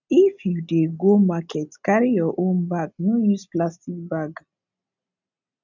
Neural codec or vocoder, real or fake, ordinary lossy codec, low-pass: none; real; none; 7.2 kHz